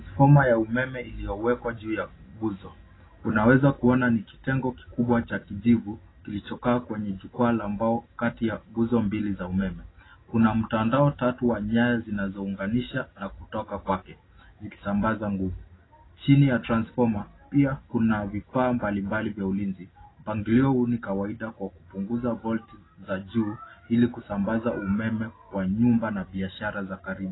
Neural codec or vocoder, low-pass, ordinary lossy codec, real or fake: none; 7.2 kHz; AAC, 16 kbps; real